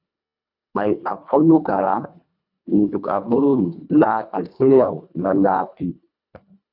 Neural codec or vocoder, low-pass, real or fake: codec, 24 kHz, 1.5 kbps, HILCodec; 5.4 kHz; fake